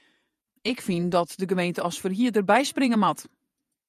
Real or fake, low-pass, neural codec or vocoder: fake; 14.4 kHz; vocoder, 48 kHz, 128 mel bands, Vocos